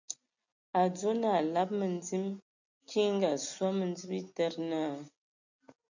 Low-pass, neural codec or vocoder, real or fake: 7.2 kHz; none; real